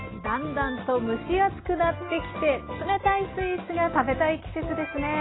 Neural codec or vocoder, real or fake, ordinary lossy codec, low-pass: none; real; AAC, 16 kbps; 7.2 kHz